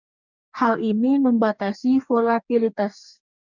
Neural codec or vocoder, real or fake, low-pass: codec, 44.1 kHz, 2.6 kbps, DAC; fake; 7.2 kHz